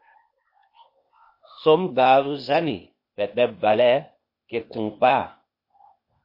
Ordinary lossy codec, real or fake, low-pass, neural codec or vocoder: MP3, 32 kbps; fake; 5.4 kHz; codec, 16 kHz, 0.8 kbps, ZipCodec